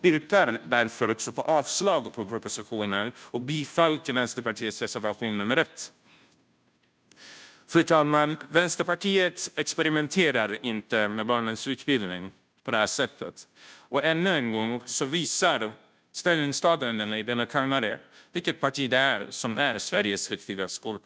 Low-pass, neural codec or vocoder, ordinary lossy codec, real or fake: none; codec, 16 kHz, 0.5 kbps, FunCodec, trained on Chinese and English, 25 frames a second; none; fake